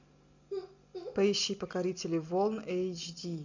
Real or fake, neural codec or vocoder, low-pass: real; none; 7.2 kHz